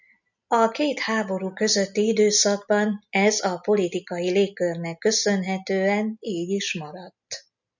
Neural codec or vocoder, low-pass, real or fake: none; 7.2 kHz; real